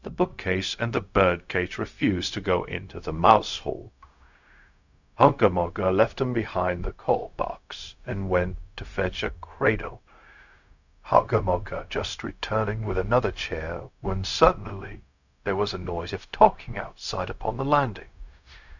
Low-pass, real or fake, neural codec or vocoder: 7.2 kHz; fake; codec, 16 kHz, 0.4 kbps, LongCat-Audio-Codec